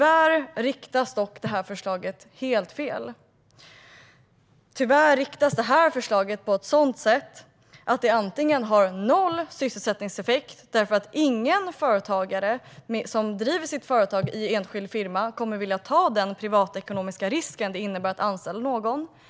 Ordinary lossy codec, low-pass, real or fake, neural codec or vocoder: none; none; real; none